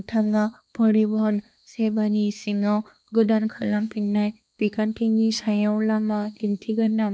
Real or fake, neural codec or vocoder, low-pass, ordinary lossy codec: fake; codec, 16 kHz, 2 kbps, X-Codec, HuBERT features, trained on balanced general audio; none; none